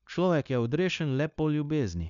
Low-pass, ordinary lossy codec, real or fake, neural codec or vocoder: 7.2 kHz; none; fake; codec, 16 kHz, 0.9 kbps, LongCat-Audio-Codec